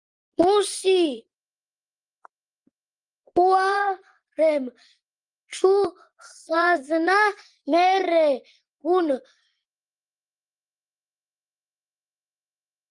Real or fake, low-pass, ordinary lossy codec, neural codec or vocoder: fake; 10.8 kHz; Opus, 24 kbps; vocoder, 24 kHz, 100 mel bands, Vocos